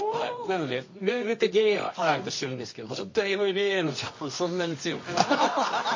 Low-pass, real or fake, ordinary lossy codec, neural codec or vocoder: 7.2 kHz; fake; MP3, 32 kbps; codec, 24 kHz, 0.9 kbps, WavTokenizer, medium music audio release